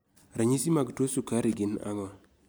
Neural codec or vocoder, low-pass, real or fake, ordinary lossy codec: none; none; real; none